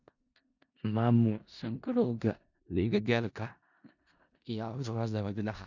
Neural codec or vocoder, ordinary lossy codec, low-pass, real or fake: codec, 16 kHz in and 24 kHz out, 0.4 kbps, LongCat-Audio-Codec, four codebook decoder; none; 7.2 kHz; fake